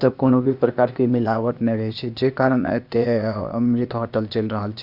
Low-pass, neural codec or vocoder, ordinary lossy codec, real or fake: 5.4 kHz; codec, 16 kHz, 0.8 kbps, ZipCodec; none; fake